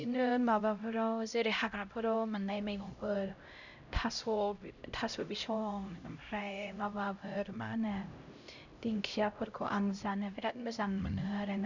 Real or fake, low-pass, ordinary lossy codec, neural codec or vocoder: fake; 7.2 kHz; none; codec, 16 kHz, 0.5 kbps, X-Codec, HuBERT features, trained on LibriSpeech